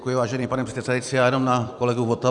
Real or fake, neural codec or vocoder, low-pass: fake; vocoder, 44.1 kHz, 128 mel bands every 256 samples, BigVGAN v2; 10.8 kHz